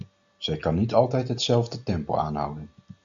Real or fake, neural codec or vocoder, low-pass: real; none; 7.2 kHz